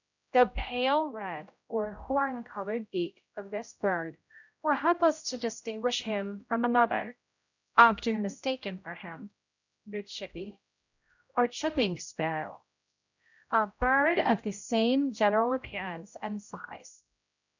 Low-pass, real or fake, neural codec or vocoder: 7.2 kHz; fake; codec, 16 kHz, 0.5 kbps, X-Codec, HuBERT features, trained on general audio